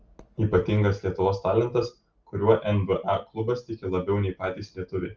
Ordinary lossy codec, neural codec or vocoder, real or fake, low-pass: Opus, 24 kbps; none; real; 7.2 kHz